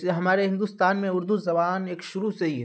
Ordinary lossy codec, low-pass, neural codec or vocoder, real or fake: none; none; none; real